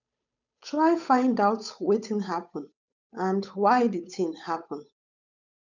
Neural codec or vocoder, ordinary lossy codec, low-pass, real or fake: codec, 16 kHz, 8 kbps, FunCodec, trained on Chinese and English, 25 frames a second; none; 7.2 kHz; fake